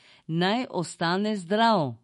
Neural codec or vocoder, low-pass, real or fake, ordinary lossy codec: autoencoder, 48 kHz, 128 numbers a frame, DAC-VAE, trained on Japanese speech; 19.8 kHz; fake; MP3, 48 kbps